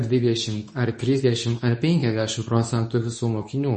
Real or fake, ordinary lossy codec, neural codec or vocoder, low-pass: fake; MP3, 32 kbps; codec, 24 kHz, 0.9 kbps, WavTokenizer, medium speech release version 2; 10.8 kHz